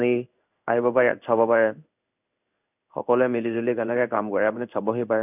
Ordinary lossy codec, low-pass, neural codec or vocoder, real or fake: none; 3.6 kHz; codec, 16 kHz in and 24 kHz out, 1 kbps, XY-Tokenizer; fake